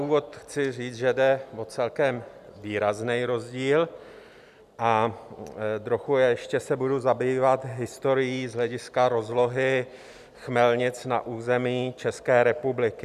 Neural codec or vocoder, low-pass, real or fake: none; 14.4 kHz; real